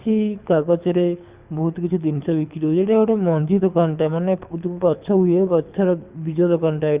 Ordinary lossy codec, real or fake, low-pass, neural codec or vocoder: Opus, 64 kbps; fake; 3.6 kHz; codec, 24 kHz, 6 kbps, HILCodec